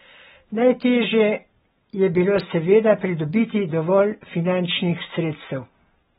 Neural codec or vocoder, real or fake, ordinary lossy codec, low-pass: none; real; AAC, 16 kbps; 14.4 kHz